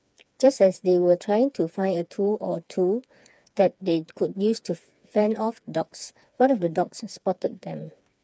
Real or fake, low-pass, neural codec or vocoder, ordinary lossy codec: fake; none; codec, 16 kHz, 4 kbps, FreqCodec, smaller model; none